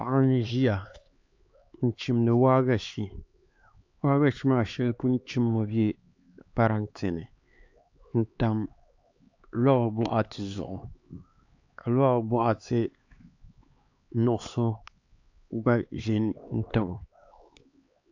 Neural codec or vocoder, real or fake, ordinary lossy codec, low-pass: codec, 16 kHz, 4 kbps, X-Codec, HuBERT features, trained on LibriSpeech; fake; AAC, 48 kbps; 7.2 kHz